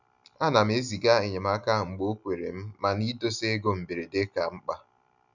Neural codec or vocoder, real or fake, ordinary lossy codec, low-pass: none; real; none; 7.2 kHz